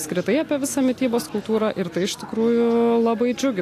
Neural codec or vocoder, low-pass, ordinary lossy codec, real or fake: none; 14.4 kHz; AAC, 64 kbps; real